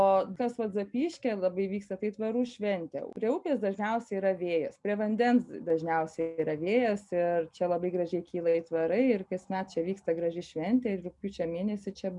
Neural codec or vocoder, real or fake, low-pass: none; real; 10.8 kHz